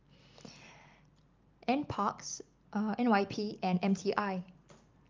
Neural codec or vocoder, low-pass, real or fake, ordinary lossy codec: none; 7.2 kHz; real; Opus, 32 kbps